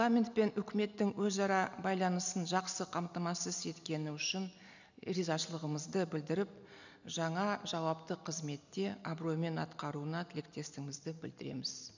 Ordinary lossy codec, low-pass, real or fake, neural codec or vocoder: none; 7.2 kHz; real; none